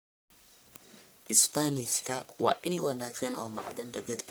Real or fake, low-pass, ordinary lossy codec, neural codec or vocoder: fake; none; none; codec, 44.1 kHz, 1.7 kbps, Pupu-Codec